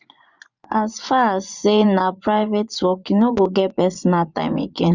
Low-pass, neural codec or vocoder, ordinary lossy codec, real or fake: 7.2 kHz; vocoder, 24 kHz, 100 mel bands, Vocos; none; fake